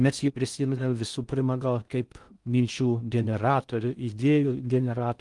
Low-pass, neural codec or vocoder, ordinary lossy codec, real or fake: 10.8 kHz; codec, 16 kHz in and 24 kHz out, 0.6 kbps, FocalCodec, streaming, 4096 codes; Opus, 32 kbps; fake